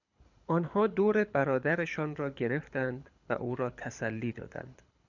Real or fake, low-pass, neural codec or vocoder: fake; 7.2 kHz; codec, 24 kHz, 6 kbps, HILCodec